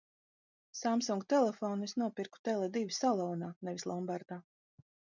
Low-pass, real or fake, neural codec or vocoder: 7.2 kHz; real; none